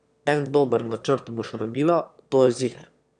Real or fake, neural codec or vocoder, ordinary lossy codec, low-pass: fake; autoencoder, 22.05 kHz, a latent of 192 numbers a frame, VITS, trained on one speaker; none; 9.9 kHz